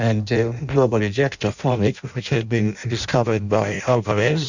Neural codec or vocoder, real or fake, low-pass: codec, 16 kHz in and 24 kHz out, 0.6 kbps, FireRedTTS-2 codec; fake; 7.2 kHz